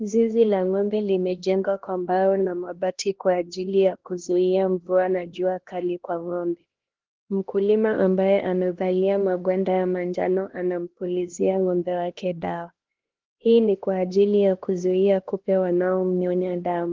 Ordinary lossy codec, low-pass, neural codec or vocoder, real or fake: Opus, 16 kbps; 7.2 kHz; codec, 16 kHz, 1 kbps, X-Codec, HuBERT features, trained on LibriSpeech; fake